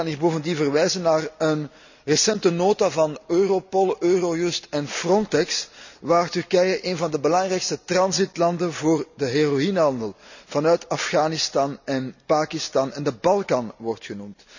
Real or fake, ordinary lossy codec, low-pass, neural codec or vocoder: real; none; 7.2 kHz; none